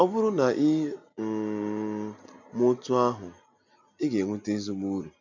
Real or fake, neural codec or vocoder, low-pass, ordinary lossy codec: real; none; 7.2 kHz; none